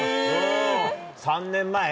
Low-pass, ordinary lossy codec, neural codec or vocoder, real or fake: none; none; none; real